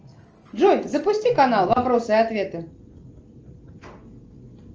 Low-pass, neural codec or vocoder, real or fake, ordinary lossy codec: 7.2 kHz; none; real; Opus, 24 kbps